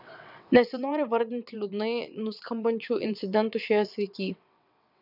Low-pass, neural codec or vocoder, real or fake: 5.4 kHz; vocoder, 22.05 kHz, 80 mel bands, WaveNeXt; fake